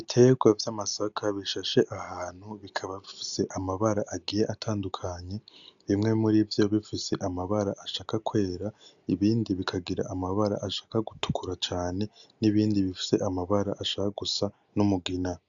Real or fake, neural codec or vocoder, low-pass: real; none; 7.2 kHz